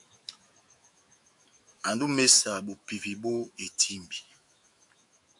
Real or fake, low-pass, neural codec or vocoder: fake; 10.8 kHz; autoencoder, 48 kHz, 128 numbers a frame, DAC-VAE, trained on Japanese speech